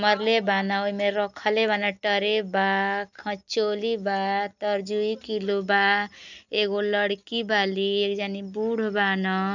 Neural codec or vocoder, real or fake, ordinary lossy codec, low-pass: none; real; none; 7.2 kHz